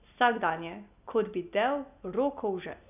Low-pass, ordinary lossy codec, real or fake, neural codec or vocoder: 3.6 kHz; none; real; none